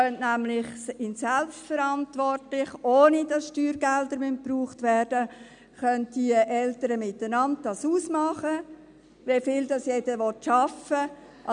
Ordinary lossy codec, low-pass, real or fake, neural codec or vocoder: none; 9.9 kHz; real; none